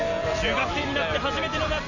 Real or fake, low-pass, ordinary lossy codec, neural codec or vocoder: real; 7.2 kHz; none; none